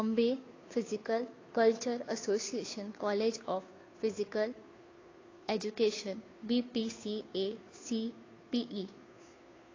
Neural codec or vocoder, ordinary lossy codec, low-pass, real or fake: codec, 16 kHz, 2 kbps, FunCodec, trained on Chinese and English, 25 frames a second; AAC, 32 kbps; 7.2 kHz; fake